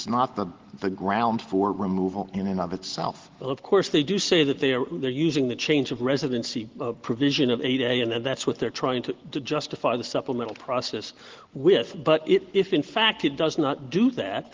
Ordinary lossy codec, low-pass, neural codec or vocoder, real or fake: Opus, 16 kbps; 7.2 kHz; none; real